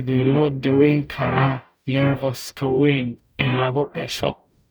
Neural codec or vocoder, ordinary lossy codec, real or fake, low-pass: codec, 44.1 kHz, 0.9 kbps, DAC; none; fake; none